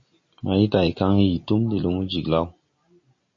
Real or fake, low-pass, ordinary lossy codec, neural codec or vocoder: real; 7.2 kHz; MP3, 32 kbps; none